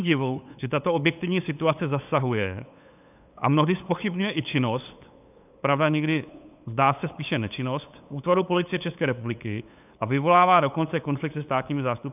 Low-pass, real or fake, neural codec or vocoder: 3.6 kHz; fake; codec, 16 kHz, 8 kbps, FunCodec, trained on LibriTTS, 25 frames a second